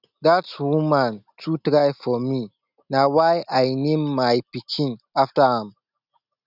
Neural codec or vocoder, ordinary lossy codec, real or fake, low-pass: none; none; real; 5.4 kHz